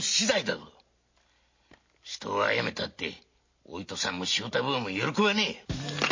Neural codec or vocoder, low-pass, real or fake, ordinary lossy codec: none; 7.2 kHz; real; MP3, 32 kbps